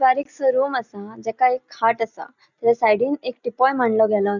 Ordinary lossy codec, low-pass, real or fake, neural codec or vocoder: none; 7.2 kHz; real; none